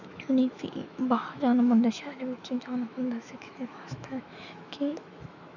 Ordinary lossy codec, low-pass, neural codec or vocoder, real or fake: none; 7.2 kHz; autoencoder, 48 kHz, 128 numbers a frame, DAC-VAE, trained on Japanese speech; fake